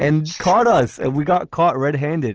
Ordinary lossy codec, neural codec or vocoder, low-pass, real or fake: Opus, 16 kbps; none; 7.2 kHz; real